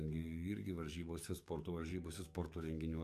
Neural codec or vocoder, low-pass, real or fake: codec, 44.1 kHz, 7.8 kbps, DAC; 14.4 kHz; fake